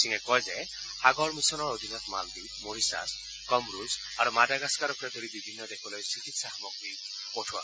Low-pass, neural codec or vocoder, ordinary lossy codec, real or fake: none; none; none; real